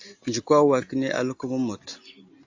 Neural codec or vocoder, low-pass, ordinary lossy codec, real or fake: none; 7.2 kHz; AAC, 48 kbps; real